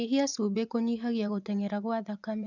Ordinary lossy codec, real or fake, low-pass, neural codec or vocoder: none; fake; 7.2 kHz; vocoder, 24 kHz, 100 mel bands, Vocos